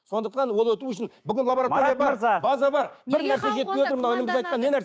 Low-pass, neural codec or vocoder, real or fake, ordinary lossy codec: none; codec, 16 kHz, 6 kbps, DAC; fake; none